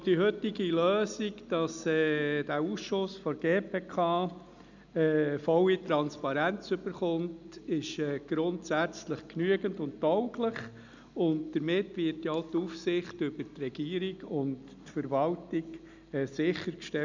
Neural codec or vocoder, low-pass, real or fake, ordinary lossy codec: none; 7.2 kHz; real; none